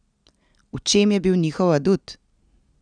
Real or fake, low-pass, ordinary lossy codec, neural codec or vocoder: real; 9.9 kHz; none; none